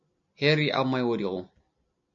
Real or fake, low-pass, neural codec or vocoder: real; 7.2 kHz; none